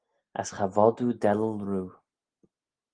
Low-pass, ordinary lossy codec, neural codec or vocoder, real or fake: 9.9 kHz; Opus, 24 kbps; none; real